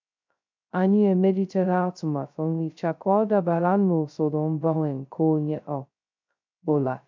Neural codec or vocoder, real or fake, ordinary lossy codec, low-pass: codec, 16 kHz, 0.2 kbps, FocalCodec; fake; MP3, 64 kbps; 7.2 kHz